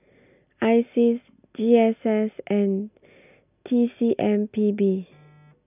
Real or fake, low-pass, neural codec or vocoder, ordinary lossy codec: real; 3.6 kHz; none; none